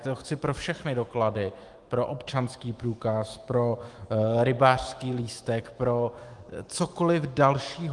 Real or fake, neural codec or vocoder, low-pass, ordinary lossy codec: fake; autoencoder, 48 kHz, 128 numbers a frame, DAC-VAE, trained on Japanese speech; 10.8 kHz; Opus, 24 kbps